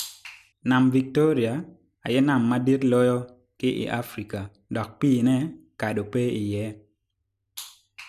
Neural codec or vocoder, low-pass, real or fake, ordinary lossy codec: none; 14.4 kHz; real; none